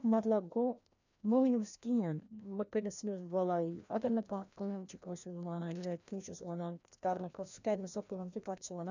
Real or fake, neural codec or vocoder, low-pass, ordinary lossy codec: fake; codec, 16 kHz, 1 kbps, FreqCodec, larger model; 7.2 kHz; none